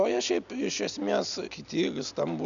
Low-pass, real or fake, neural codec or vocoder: 7.2 kHz; real; none